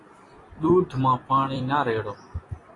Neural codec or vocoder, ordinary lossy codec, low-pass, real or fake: vocoder, 44.1 kHz, 128 mel bands every 256 samples, BigVGAN v2; AAC, 32 kbps; 10.8 kHz; fake